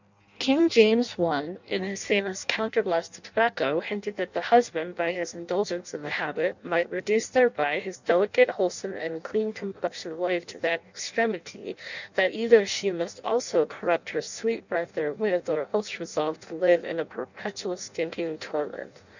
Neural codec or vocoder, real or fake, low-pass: codec, 16 kHz in and 24 kHz out, 0.6 kbps, FireRedTTS-2 codec; fake; 7.2 kHz